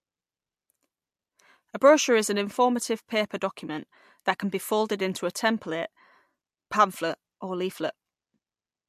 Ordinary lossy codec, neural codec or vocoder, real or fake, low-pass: MP3, 64 kbps; none; real; 14.4 kHz